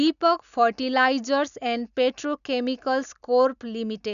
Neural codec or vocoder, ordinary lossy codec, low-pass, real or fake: none; none; 7.2 kHz; real